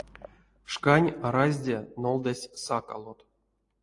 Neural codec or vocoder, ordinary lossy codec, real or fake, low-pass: none; AAC, 48 kbps; real; 10.8 kHz